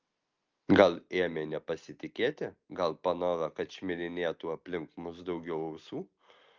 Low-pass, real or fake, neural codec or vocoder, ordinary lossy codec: 7.2 kHz; real; none; Opus, 32 kbps